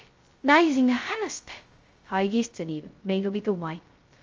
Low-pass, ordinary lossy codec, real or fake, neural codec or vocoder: 7.2 kHz; Opus, 32 kbps; fake; codec, 16 kHz, 0.2 kbps, FocalCodec